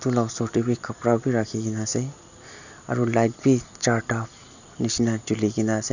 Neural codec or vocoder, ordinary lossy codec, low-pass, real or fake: none; none; 7.2 kHz; real